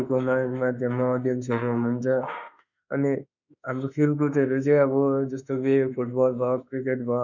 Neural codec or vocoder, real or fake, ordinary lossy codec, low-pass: autoencoder, 48 kHz, 32 numbers a frame, DAC-VAE, trained on Japanese speech; fake; none; 7.2 kHz